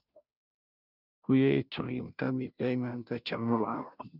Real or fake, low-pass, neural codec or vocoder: fake; 5.4 kHz; codec, 16 kHz, 0.5 kbps, FunCodec, trained on Chinese and English, 25 frames a second